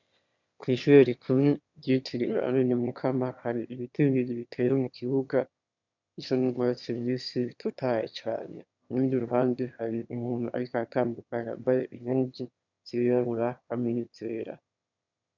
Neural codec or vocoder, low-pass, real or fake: autoencoder, 22.05 kHz, a latent of 192 numbers a frame, VITS, trained on one speaker; 7.2 kHz; fake